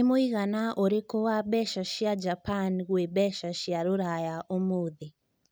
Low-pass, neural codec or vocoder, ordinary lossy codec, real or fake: none; none; none; real